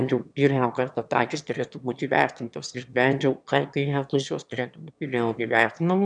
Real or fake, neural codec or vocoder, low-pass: fake; autoencoder, 22.05 kHz, a latent of 192 numbers a frame, VITS, trained on one speaker; 9.9 kHz